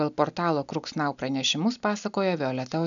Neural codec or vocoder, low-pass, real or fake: none; 7.2 kHz; real